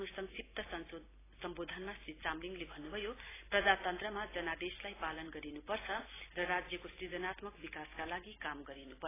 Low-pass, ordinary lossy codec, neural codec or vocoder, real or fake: 3.6 kHz; AAC, 16 kbps; none; real